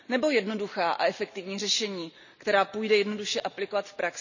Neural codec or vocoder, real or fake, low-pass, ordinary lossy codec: none; real; 7.2 kHz; none